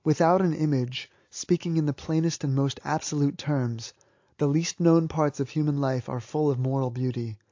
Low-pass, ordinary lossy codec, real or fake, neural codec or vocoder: 7.2 kHz; AAC, 48 kbps; real; none